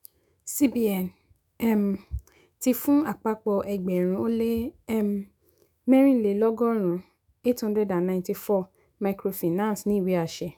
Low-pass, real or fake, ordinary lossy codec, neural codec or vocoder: none; fake; none; autoencoder, 48 kHz, 128 numbers a frame, DAC-VAE, trained on Japanese speech